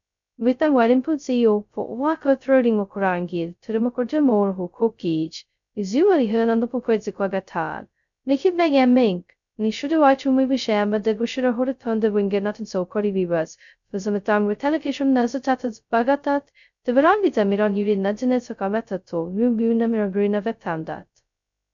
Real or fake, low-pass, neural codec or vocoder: fake; 7.2 kHz; codec, 16 kHz, 0.2 kbps, FocalCodec